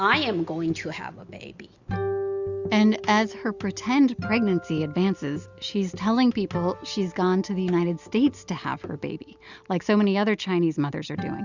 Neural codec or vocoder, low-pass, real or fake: none; 7.2 kHz; real